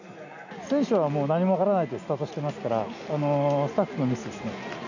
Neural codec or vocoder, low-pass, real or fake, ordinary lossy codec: none; 7.2 kHz; real; none